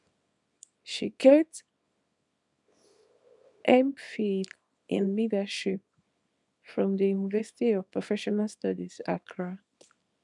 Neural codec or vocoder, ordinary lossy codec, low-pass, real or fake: codec, 24 kHz, 0.9 kbps, WavTokenizer, small release; none; 10.8 kHz; fake